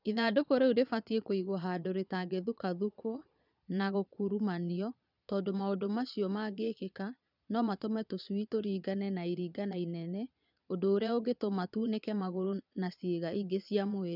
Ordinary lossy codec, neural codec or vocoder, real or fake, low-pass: none; vocoder, 22.05 kHz, 80 mel bands, Vocos; fake; 5.4 kHz